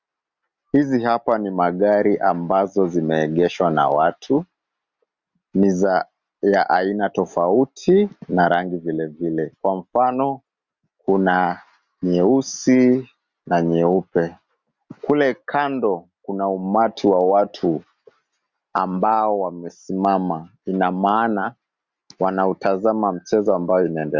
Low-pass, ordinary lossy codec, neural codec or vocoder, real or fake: 7.2 kHz; Opus, 64 kbps; none; real